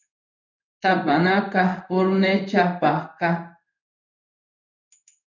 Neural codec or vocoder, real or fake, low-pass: codec, 16 kHz in and 24 kHz out, 1 kbps, XY-Tokenizer; fake; 7.2 kHz